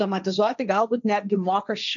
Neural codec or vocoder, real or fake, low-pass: codec, 16 kHz, 1.1 kbps, Voila-Tokenizer; fake; 7.2 kHz